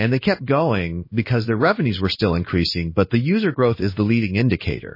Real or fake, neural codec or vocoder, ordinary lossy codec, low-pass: fake; codec, 16 kHz in and 24 kHz out, 1 kbps, XY-Tokenizer; MP3, 24 kbps; 5.4 kHz